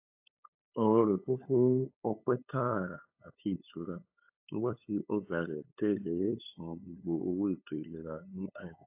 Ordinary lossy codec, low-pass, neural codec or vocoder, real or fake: AAC, 32 kbps; 3.6 kHz; codec, 16 kHz, 8 kbps, FunCodec, trained on LibriTTS, 25 frames a second; fake